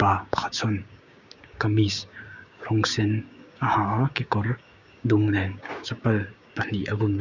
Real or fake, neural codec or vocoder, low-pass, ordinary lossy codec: fake; codec, 16 kHz, 6 kbps, DAC; 7.2 kHz; none